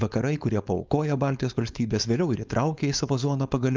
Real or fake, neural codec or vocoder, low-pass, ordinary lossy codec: fake; codec, 16 kHz, 4.8 kbps, FACodec; 7.2 kHz; Opus, 24 kbps